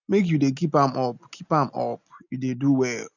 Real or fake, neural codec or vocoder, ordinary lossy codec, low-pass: real; none; none; 7.2 kHz